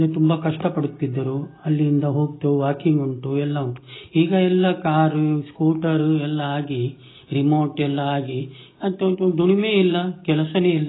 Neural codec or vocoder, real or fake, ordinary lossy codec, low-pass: none; real; AAC, 16 kbps; 7.2 kHz